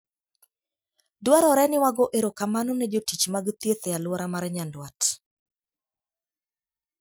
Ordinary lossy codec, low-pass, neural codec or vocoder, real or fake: none; none; none; real